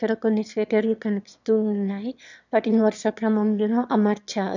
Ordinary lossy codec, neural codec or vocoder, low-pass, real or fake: none; autoencoder, 22.05 kHz, a latent of 192 numbers a frame, VITS, trained on one speaker; 7.2 kHz; fake